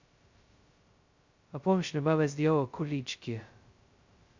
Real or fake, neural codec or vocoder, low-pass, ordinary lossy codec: fake; codec, 16 kHz, 0.2 kbps, FocalCodec; 7.2 kHz; Opus, 64 kbps